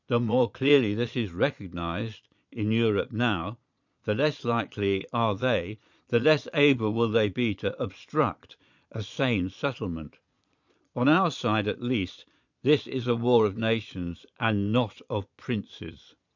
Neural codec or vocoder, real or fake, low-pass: vocoder, 22.05 kHz, 80 mel bands, Vocos; fake; 7.2 kHz